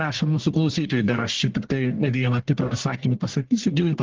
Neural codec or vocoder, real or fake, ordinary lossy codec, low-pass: codec, 44.1 kHz, 1.7 kbps, Pupu-Codec; fake; Opus, 16 kbps; 7.2 kHz